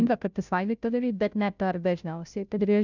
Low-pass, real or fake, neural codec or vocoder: 7.2 kHz; fake; codec, 16 kHz, 0.5 kbps, FunCodec, trained on Chinese and English, 25 frames a second